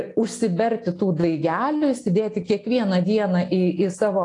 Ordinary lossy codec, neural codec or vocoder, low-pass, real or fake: AAC, 48 kbps; none; 10.8 kHz; real